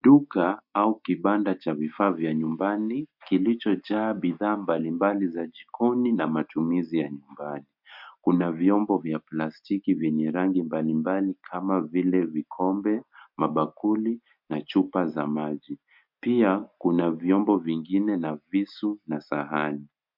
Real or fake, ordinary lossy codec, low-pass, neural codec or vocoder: real; MP3, 48 kbps; 5.4 kHz; none